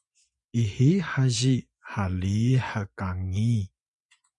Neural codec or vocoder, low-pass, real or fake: vocoder, 24 kHz, 100 mel bands, Vocos; 10.8 kHz; fake